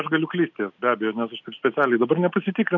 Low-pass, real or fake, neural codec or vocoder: 7.2 kHz; real; none